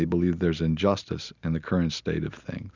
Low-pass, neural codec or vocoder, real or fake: 7.2 kHz; none; real